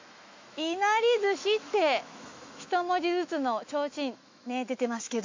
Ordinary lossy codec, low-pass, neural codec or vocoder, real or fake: MP3, 48 kbps; 7.2 kHz; autoencoder, 48 kHz, 128 numbers a frame, DAC-VAE, trained on Japanese speech; fake